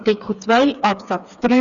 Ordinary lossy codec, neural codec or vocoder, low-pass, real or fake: none; codec, 16 kHz, 4 kbps, FreqCodec, smaller model; 7.2 kHz; fake